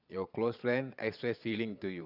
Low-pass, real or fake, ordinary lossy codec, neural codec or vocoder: 5.4 kHz; fake; none; vocoder, 22.05 kHz, 80 mel bands, Vocos